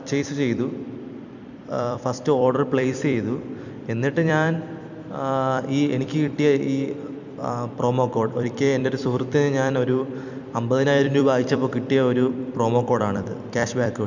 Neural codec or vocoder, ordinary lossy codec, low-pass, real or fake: vocoder, 44.1 kHz, 128 mel bands every 256 samples, BigVGAN v2; none; 7.2 kHz; fake